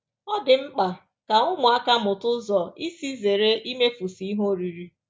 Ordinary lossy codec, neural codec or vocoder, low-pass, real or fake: none; none; none; real